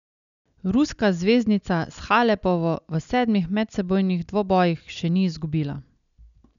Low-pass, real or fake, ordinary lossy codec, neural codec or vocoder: 7.2 kHz; real; none; none